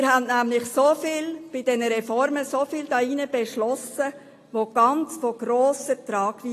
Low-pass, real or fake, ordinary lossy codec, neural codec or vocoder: 14.4 kHz; real; AAC, 48 kbps; none